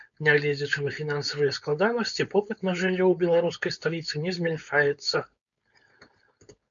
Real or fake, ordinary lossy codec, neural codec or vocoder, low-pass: fake; MP3, 96 kbps; codec, 16 kHz, 4.8 kbps, FACodec; 7.2 kHz